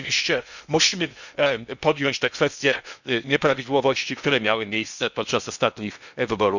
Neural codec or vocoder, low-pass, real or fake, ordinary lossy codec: codec, 16 kHz in and 24 kHz out, 0.8 kbps, FocalCodec, streaming, 65536 codes; 7.2 kHz; fake; none